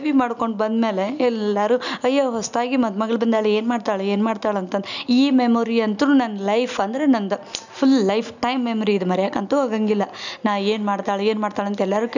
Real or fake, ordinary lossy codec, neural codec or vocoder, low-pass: real; none; none; 7.2 kHz